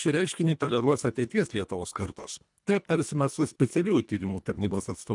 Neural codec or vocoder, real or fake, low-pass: codec, 24 kHz, 1.5 kbps, HILCodec; fake; 10.8 kHz